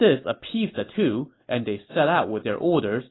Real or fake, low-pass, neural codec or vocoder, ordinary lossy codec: real; 7.2 kHz; none; AAC, 16 kbps